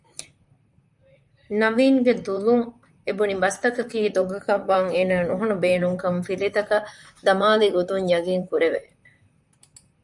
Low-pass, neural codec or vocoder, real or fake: 10.8 kHz; vocoder, 44.1 kHz, 128 mel bands, Pupu-Vocoder; fake